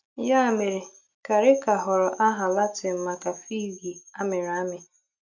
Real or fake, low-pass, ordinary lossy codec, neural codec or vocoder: real; 7.2 kHz; none; none